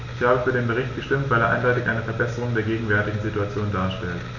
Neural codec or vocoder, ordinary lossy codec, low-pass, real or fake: none; none; 7.2 kHz; real